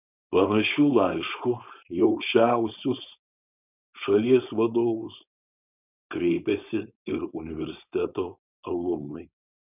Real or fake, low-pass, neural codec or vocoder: fake; 3.6 kHz; codec, 16 kHz, 4.8 kbps, FACodec